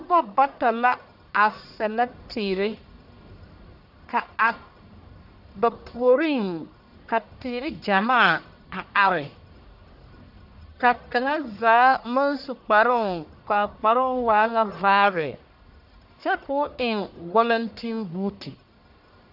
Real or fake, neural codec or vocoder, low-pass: fake; codec, 44.1 kHz, 1.7 kbps, Pupu-Codec; 5.4 kHz